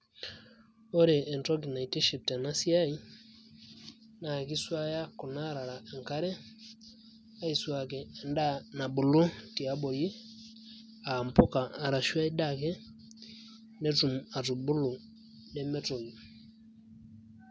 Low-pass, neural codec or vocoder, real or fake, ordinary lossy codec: none; none; real; none